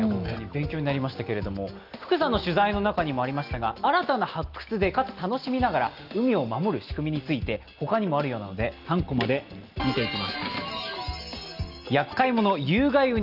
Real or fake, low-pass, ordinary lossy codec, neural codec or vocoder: real; 5.4 kHz; Opus, 32 kbps; none